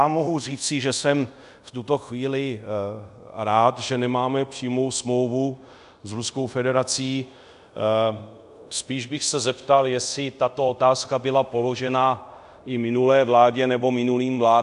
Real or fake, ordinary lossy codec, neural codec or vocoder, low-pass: fake; AAC, 96 kbps; codec, 24 kHz, 0.5 kbps, DualCodec; 10.8 kHz